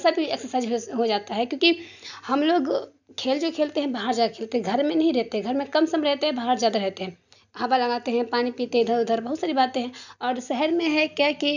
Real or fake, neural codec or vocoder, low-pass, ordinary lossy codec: real; none; 7.2 kHz; none